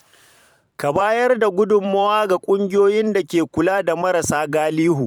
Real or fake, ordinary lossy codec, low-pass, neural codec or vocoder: fake; none; 19.8 kHz; vocoder, 44.1 kHz, 128 mel bands every 512 samples, BigVGAN v2